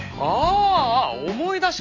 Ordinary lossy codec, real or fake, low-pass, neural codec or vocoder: MP3, 64 kbps; real; 7.2 kHz; none